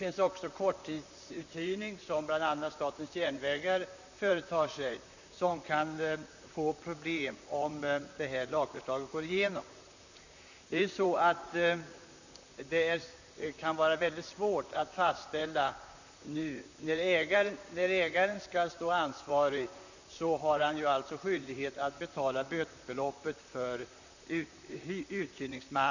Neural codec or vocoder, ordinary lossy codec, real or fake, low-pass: vocoder, 44.1 kHz, 128 mel bands, Pupu-Vocoder; none; fake; 7.2 kHz